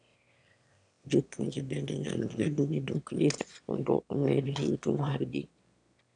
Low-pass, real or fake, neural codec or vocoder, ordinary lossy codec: 9.9 kHz; fake; autoencoder, 22.05 kHz, a latent of 192 numbers a frame, VITS, trained on one speaker; none